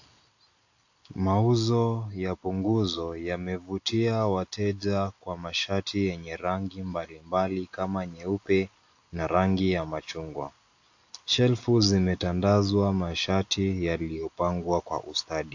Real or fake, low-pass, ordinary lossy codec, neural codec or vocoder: real; 7.2 kHz; AAC, 48 kbps; none